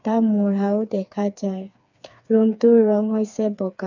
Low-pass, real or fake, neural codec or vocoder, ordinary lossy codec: 7.2 kHz; fake; codec, 16 kHz, 4 kbps, FreqCodec, smaller model; none